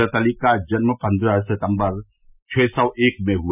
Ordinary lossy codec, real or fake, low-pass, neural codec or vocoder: none; real; 3.6 kHz; none